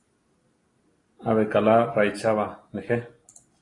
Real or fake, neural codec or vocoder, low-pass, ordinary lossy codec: real; none; 10.8 kHz; AAC, 32 kbps